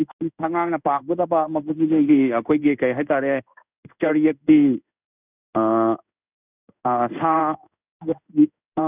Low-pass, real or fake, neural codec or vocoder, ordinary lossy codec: 3.6 kHz; fake; codec, 16 kHz in and 24 kHz out, 1 kbps, XY-Tokenizer; none